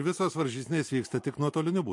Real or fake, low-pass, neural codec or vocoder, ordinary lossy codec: real; 10.8 kHz; none; MP3, 48 kbps